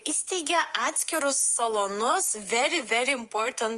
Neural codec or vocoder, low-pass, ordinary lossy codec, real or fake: codec, 24 kHz, 3.1 kbps, DualCodec; 10.8 kHz; Opus, 24 kbps; fake